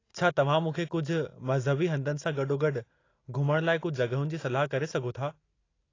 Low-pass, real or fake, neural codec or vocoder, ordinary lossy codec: 7.2 kHz; real; none; AAC, 32 kbps